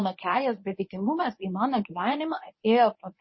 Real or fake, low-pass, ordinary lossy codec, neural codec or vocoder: fake; 7.2 kHz; MP3, 24 kbps; codec, 24 kHz, 0.9 kbps, WavTokenizer, medium speech release version 1